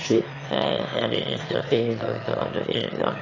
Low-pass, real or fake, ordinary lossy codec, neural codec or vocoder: 7.2 kHz; fake; AAC, 32 kbps; autoencoder, 22.05 kHz, a latent of 192 numbers a frame, VITS, trained on one speaker